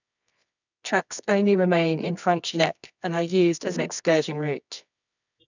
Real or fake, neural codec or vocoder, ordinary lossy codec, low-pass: fake; codec, 24 kHz, 0.9 kbps, WavTokenizer, medium music audio release; none; 7.2 kHz